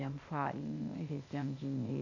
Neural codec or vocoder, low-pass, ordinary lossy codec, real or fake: codec, 16 kHz, 0.8 kbps, ZipCodec; 7.2 kHz; none; fake